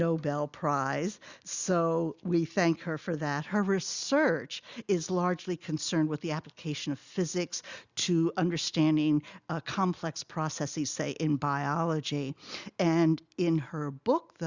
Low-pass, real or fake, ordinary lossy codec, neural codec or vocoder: 7.2 kHz; real; Opus, 64 kbps; none